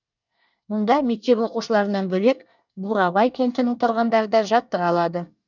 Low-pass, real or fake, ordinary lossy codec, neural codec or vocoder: 7.2 kHz; fake; none; codec, 24 kHz, 1 kbps, SNAC